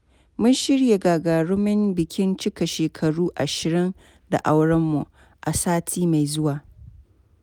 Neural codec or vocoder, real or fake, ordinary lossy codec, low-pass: none; real; none; none